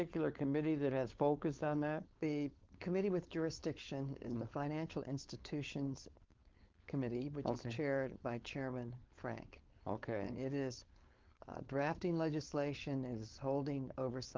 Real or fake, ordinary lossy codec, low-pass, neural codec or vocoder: fake; Opus, 32 kbps; 7.2 kHz; codec, 16 kHz, 4.8 kbps, FACodec